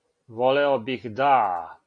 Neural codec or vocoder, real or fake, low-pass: none; real; 9.9 kHz